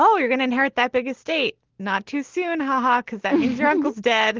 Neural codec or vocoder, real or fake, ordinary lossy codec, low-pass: none; real; Opus, 16 kbps; 7.2 kHz